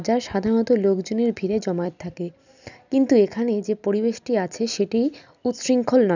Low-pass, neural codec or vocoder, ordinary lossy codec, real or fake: 7.2 kHz; none; none; real